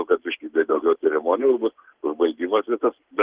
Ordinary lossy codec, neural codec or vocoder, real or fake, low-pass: Opus, 16 kbps; none; real; 3.6 kHz